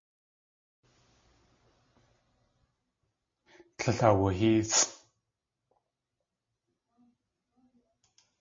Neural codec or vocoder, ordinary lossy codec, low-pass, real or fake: none; MP3, 32 kbps; 7.2 kHz; real